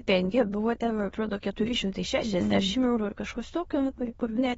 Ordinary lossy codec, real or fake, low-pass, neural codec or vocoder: AAC, 24 kbps; fake; 9.9 kHz; autoencoder, 22.05 kHz, a latent of 192 numbers a frame, VITS, trained on many speakers